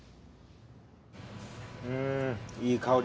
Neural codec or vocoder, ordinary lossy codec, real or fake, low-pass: none; none; real; none